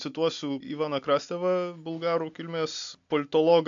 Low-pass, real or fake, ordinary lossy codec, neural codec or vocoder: 7.2 kHz; real; AAC, 64 kbps; none